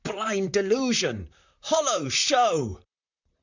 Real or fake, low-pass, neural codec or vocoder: fake; 7.2 kHz; vocoder, 44.1 kHz, 128 mel bands, Pupu-Vocoder